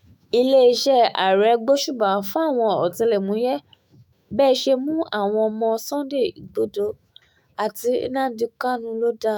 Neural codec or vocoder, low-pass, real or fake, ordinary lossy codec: autoencoder, 48 kHz, 128 numbers a frame, DAC-VAE, trained on Japanese speech; none; fake; none